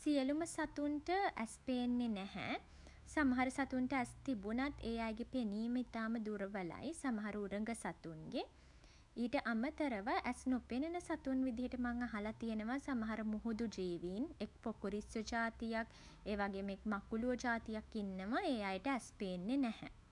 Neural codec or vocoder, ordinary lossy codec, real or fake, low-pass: autoencoder, 48 kHz, 128 numbers a frame, DAC-VAE, trained on Japanese speech; none; fake; 10.8 kHz